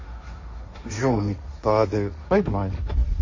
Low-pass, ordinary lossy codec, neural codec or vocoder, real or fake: 7.2 kHz; MP3, 32 kbps; codec, 16 kHz, 1.1 kbps, Voila-Tokenizer; fake